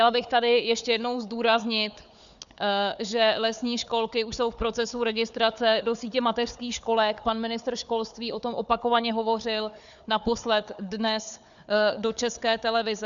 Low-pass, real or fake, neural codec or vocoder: 7.2 kHz; fake; codec, 16 kHz, 4 kbps, FunCodec, trained on Chinese and English, 50 frames a second